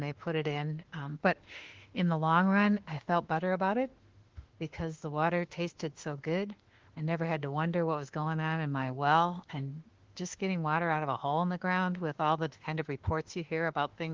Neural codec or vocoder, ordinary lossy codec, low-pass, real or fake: autoencoder, 48 kHz, 32 numbers a frame, DAC-VAE, trained on Japanese speech; Opus, 16 kbps; 7.2 kHz; fake